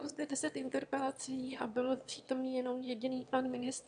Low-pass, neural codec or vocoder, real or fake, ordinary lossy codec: 9.9 kHz; autoencoder, 22.05 kHz, a latent of 192 numbers a frame, VITS, trained on one speaker; fake; AAC, 64 kbps